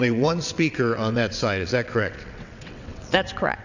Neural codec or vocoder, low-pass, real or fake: vocoder, 22.05 kHz, 80 mel bands, WaveNeXt; 7.2 kHz; fake